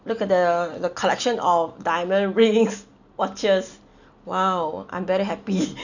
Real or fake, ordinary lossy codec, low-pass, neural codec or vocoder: real; none; 7.2 kHz; none